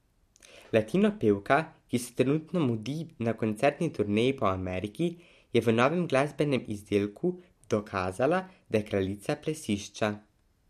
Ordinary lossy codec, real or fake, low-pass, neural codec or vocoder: MP3, 64 kbps; real; 14.4 kHz; none